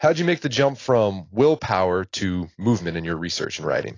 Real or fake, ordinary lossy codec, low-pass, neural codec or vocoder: real; AAC, 32 kbps; 7.2 kHz; none